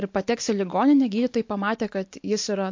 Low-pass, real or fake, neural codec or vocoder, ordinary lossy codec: 7.2 kHz; real; none; MP3, 48 kbps